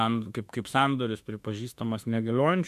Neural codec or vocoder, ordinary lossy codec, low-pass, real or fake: autoencoder, 48 kHz, 32 numbers a frame, DAC-VAE, trained on Japanese speech; MP3, 96 kbps; 14.4 kHz; fake